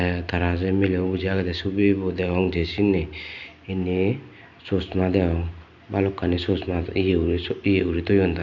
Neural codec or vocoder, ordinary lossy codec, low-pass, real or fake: none; none; 7.2 kHz; real